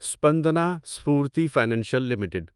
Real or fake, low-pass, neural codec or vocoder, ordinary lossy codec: fake; 10.8 kHz; codec, 24 kHz, 1.2 kbps, DualCodec; Opus, 32 kbps